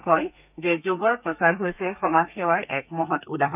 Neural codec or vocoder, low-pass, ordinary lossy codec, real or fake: codec, 32 kHz, 1.9 kbps, SNAC; 3.6 kHz; none; fake